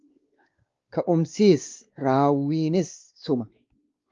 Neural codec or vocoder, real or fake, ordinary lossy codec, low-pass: codec, 16 kHz, 2 kbps, X-Codec, WavLM features, trained on Multilingual LibriSpeech; fake; Opus, 32 kbps; 7.2 kHz